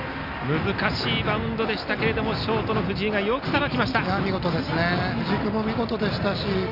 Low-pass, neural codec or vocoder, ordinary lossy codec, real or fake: 5.4 kHz; none; none; real